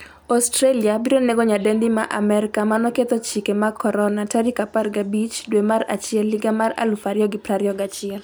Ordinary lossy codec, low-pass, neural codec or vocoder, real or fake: none; none; none; real